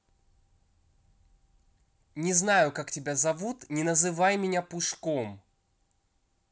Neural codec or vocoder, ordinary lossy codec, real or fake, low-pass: none; none; real; none